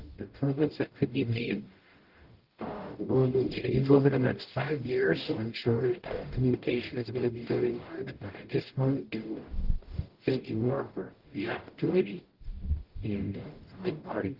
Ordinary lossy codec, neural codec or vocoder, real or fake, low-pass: Opus, 16 kbps; codec, 44.1 kHz, 0.9 kbps, DAC; fake; 5.4 kHz